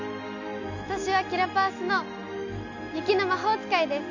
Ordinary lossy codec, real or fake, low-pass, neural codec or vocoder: none; real; 7.2 kHz; none